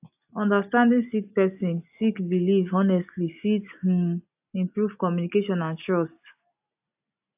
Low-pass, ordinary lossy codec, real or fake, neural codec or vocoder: 3.6 kHz; AAC, 32 kbps; real; none